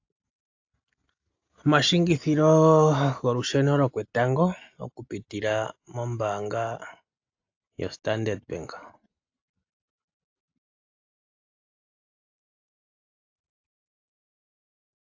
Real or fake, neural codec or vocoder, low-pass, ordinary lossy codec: real; none; 7.2 kHz; AAC, 48 kbps